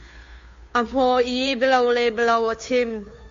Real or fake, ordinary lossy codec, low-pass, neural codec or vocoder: fake; MP3, 48 kbps; 7.2 kHz; codec, 16 kHz, 2 kbps, FunCodec, trained on Chinese and English, 25 frames a second